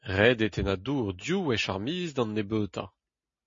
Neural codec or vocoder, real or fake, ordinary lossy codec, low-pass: none; real; MP3, 32 kbps; 7.2 kHz